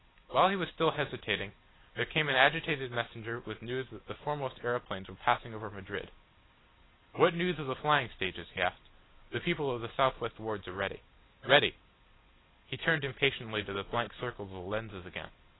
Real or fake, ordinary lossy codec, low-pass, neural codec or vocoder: real; AAC, 16 kbps; 7.2 kHz; none